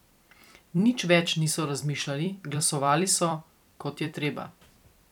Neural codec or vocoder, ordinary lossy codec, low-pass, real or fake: vocoder, 44.1 kHz, 128 mel bands every 256 samples, BigVGAN v2; none; 19.8 kHz; fake